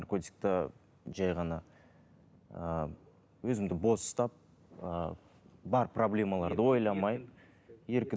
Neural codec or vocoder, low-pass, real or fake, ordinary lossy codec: none; none; real; none